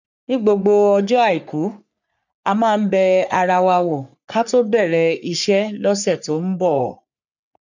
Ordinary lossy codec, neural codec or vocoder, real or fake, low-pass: none; codec, 44.1 kHz, 3.4 kbps, Pupu-Codec; fake; 7.2 kHz